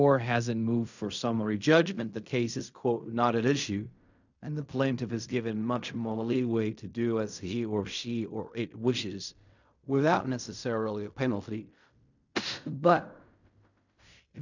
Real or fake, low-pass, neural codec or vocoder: fake; 7.2 kHz; codec, 16 kHz in and 24 kHz out, 0.4 kbps, LongCat-Audio-Codec, fine tuned four codebook decoder